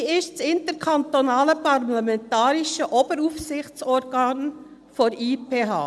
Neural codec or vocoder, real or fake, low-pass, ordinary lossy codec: none; real; none; none